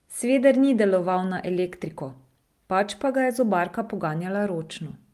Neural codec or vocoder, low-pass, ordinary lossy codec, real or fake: none; 14.4 kHz; Opus, 32 kbps; real